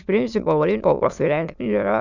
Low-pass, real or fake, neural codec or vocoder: 7.2 kHz; fake; autoencoder, 22.05 kHz, a latent of 192 numbers a frame, VITS, trained on many speakers